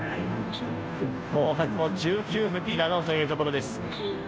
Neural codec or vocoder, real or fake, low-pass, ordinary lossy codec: codec, 16 kHz, 0.5 kbps, FunCodec, trained on Chinese and English, 25 frames a second; fake; none; none